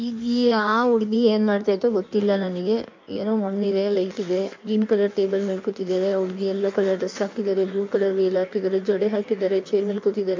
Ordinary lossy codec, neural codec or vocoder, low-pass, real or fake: MP3, 64 kbps; codec, 16 kHz in and 24 kHz out, 1.1 kbps, FireRedTTS-2 codec; 7.2 kHz; fake